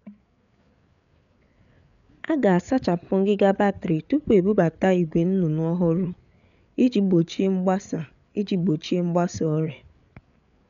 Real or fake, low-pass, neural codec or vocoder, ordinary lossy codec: fake; 7.2 kHz; codec, 16 kHz, 8 kbps, FreqCodec, larger model; none